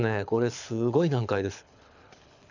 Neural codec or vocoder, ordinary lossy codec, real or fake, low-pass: codec, 24 kHz, 6 kbps, HILCodec; none; fake; 7.2 kHz